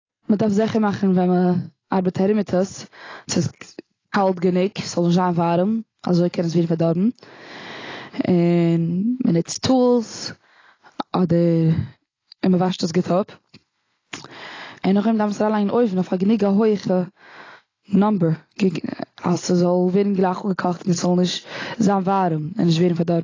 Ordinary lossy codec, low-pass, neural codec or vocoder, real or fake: AAC, 32 kbps; 7.2 kHz; none; real